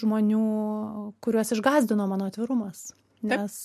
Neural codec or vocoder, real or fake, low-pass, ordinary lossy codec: none; real; 14.4 kHz; MP3, 64 kbps